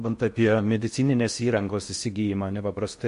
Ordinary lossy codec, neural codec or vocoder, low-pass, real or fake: MP3, 48 kbps; codec, 16 kHz in and 24 kHz out, 0.6 kbps, FocalCodec, streaming, 4096 codes; 10.8 kHz; fake